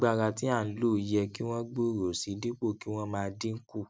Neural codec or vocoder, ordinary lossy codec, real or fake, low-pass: none; none; real; none